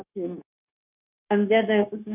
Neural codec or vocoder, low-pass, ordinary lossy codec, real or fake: codec, 16 kHz, 0.9 kbps, LongCat-Audio-Codec; 3.6 kHz; none; fake